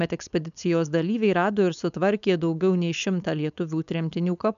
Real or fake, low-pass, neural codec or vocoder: fake; 7.2 kHz; codec, 16 kHz, 4.8 kbps, FACodec